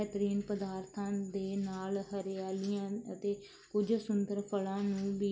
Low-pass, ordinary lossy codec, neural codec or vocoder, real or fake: none; none; none; real